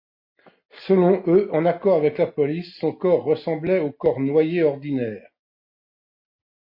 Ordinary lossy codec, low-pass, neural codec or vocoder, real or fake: MP3, 32 kbps; 5.4 kHz; none; real